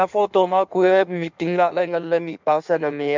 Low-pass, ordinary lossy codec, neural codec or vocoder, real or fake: 7.2 kHz; MP3, 64 kbps; codec, 16 kHz in and 24 kHz out, 1.1 kbps, FireRedTTS-2 codec; fake